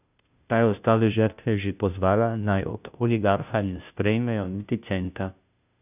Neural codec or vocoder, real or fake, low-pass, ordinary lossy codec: codec, 16 kHz, 0.5 kbps, FunCodec, trained on Chinese and English, 25 frames a second; fake; 3.6 kHz; none